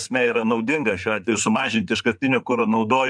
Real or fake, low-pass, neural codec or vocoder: fake; 9.9 kHz; codec, 16 kHz in and 24 kHz out, 2.2 kbps, FireRedTTS-2 codec